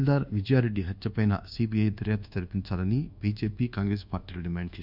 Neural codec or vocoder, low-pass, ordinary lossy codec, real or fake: codec, 24 kHz, 1.2 kbps, DualCodec; 5.4 kHz; none; fake